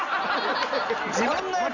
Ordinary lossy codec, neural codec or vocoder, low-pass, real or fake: none; none; 7.2 kHz; real